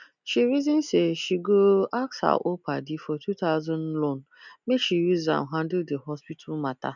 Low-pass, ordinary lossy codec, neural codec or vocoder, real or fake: 7.2 kHz; none; none; real